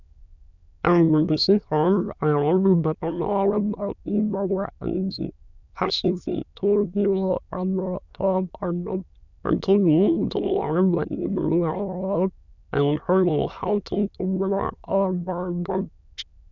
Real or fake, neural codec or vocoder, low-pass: fake; autoencoder, 22.05 kHz, a latent of 192 numbers a frame, VITS, trained on many speakers; 7.2 kHz